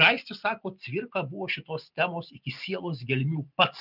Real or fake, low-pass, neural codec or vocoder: real; 5.4 kHz; none